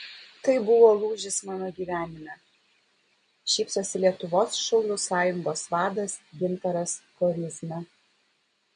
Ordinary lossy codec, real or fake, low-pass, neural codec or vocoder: MP3, 48 kbps; real; 9.9 kHz; none